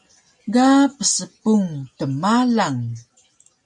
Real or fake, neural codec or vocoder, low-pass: real; none; 10.8 kHz